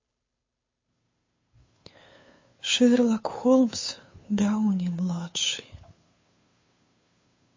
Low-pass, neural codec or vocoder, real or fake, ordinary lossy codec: 7.2 kHz; codec, 16 kHz, 2 kbps, FunCodec, trained on Chinese and English, 25 frames a second; fake; MP3, 32 kbps